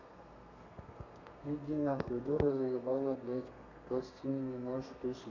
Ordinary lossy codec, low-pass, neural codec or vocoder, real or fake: none; 7.2 kHz; codec, 32 kHz, 1.9 kbps, SNAC; fake